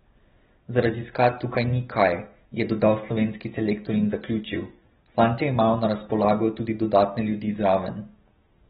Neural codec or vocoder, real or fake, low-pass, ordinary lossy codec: none; real; 7.2 kHz; AAC, 16 kbps